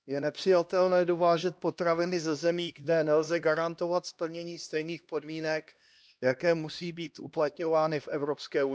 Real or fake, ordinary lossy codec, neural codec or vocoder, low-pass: fake; none; codec, 16 kHz, 1 kbps, X-Codec, HuBERT features, trained on LibriSpeech; none